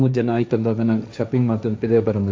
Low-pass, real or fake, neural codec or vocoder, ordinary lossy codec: none; fake; codec, 16 kHz, 1.1 kbps, Voila-Tokenizer; none